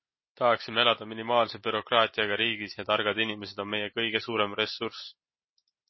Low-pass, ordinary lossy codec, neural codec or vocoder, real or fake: 7.2 kHz; MP3, 24 kbps; none; real